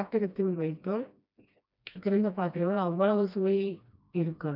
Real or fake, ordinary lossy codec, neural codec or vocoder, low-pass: fake; none; codec, 16 kHz, 1 kbps, FreqCodec, smaller model; 5.4 kHz